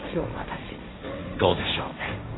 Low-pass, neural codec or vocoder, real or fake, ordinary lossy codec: 7.2 kHz; codec, 16 kHz, 1.1 kbps, Voila-Tokenizer; fake; AAC, 16 kbps